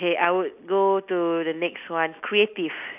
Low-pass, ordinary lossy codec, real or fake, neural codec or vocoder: 3.6 kHz; none; real; none